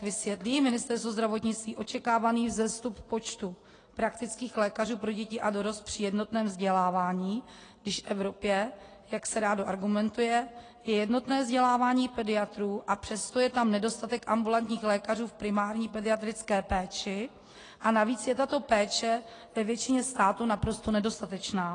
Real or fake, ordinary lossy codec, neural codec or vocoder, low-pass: real; AAC, 32 kbps; none; 9.9 kHz